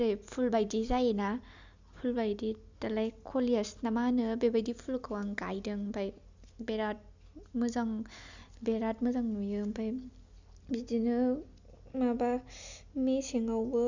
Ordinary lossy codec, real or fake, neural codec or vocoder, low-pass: Opus, 64 kbps; real; none; 7.2 kHz